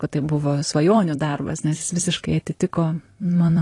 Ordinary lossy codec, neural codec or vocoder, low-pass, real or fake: AAC, 32 kbps; vocoder, 44.1 kHz, 128 mel bands every 256 samples, BigVGAN v2; 10.8 kHz; fake